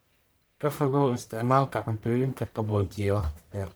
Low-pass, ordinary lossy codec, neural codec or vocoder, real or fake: none; none; codec, 44.1 kHz, 1.7 kbps, Pupu-Codec; fake